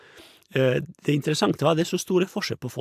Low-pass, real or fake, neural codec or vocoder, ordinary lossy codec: 14.4 kHz; real; none; AAC, 96 kbps